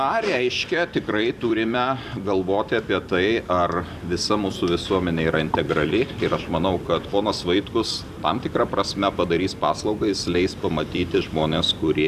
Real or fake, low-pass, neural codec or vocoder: fake; 14.4 kHz; vocoder, 44.1 kHz, 128 mel bands every 256 samples, BigVGAN v2